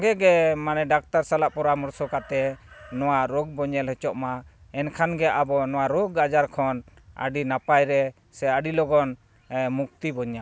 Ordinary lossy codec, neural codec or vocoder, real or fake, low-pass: none; none; real; none